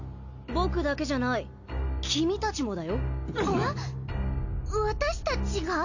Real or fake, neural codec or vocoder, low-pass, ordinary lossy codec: real; none; 7.2 kHz; none